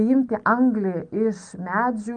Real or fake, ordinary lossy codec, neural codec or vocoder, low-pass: real; Opus, 32 kbps; none; 9.9 kHz